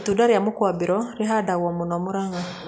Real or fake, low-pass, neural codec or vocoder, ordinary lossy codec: real; none; none; none